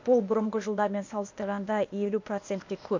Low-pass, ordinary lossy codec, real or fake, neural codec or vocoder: 7.2 kHz; MP3, 64 kbps; fake; codec, 16 kHz, 0.8 kbps, ZipCodec